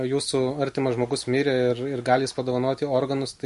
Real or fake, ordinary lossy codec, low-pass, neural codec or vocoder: real; MP3, 48 kbps; 14.4 kHz; none